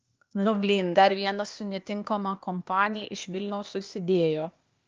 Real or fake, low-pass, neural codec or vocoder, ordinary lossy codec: fake; 7.2 kHz; codec, 16 kHz, 0.8 kbps, ZipCodec; Opus, 24 kbps